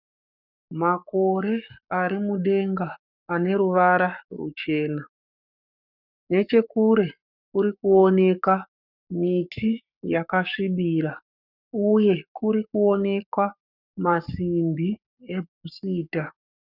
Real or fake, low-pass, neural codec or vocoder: fake; 5.4 kHz; codec, 44.1 kHz, 7.8 kbps, Pupu-Codec